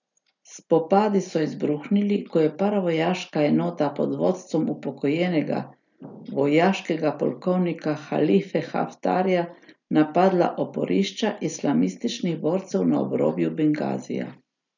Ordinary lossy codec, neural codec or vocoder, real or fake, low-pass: none; none; real; 7.2 kHz